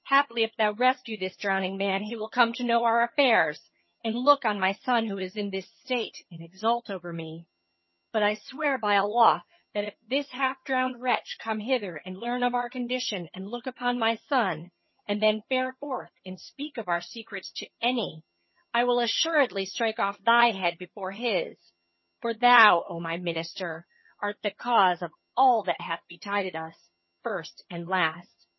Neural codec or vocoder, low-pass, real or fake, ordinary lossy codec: vocoder, 22.05 kHz, 80 mel bands, HiFi-GAN; 7.2 kHz; fake; MP3, 24 kbps